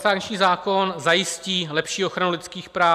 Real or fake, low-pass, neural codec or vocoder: real; 14.4 kHz; none